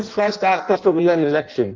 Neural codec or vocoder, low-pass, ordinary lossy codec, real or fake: codec, 16 kHz in and 24 kHz out, 0.6 kbps, FireRedTTS-2 codec; 7.2 kHz; Opus, 32 kbps; fake